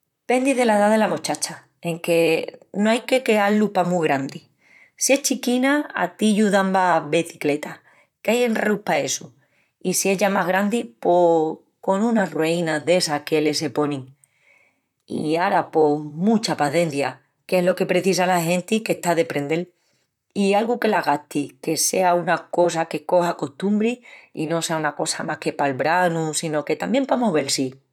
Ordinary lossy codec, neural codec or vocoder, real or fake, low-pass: none; vocoder, 44.1 kHz, 128 mel bands, Pupu-Vocoder; fake; 19.8 kHz